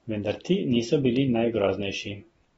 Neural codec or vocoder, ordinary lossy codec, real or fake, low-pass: none; AAC, 24 kbps; real; 19.8 kHz